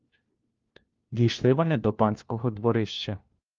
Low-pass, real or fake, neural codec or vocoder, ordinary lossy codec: 7.2 kHz; fake; codec, 16 kHz, 1 kbps, FunCodec, trained on LibriTTS, 50 frames a second; Opus, 32 kbps